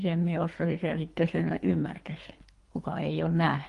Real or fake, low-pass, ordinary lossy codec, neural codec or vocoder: fake; 10.8 kHz; Opus, 24 kbps; codec, 24 kHz, 3 kbps, HILCodec